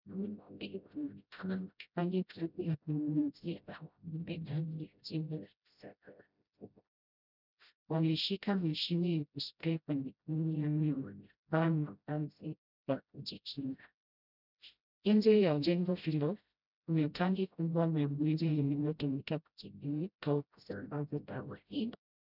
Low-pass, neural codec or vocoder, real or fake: 5.4 kHz; codec, 16 kHz, 0.5 kbps, FreqCodec, smaller model; fake